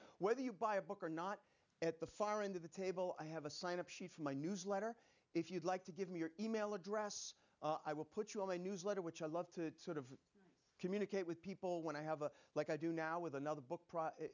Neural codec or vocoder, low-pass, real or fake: none; 7.2 kHz; real